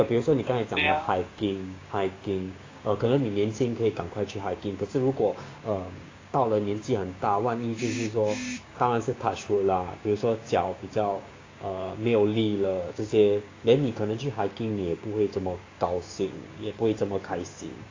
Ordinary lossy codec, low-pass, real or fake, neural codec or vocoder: AAC, 32 kbps; 7.2 kHz; fake; codec, 16 kHz, 6 kbps, DAC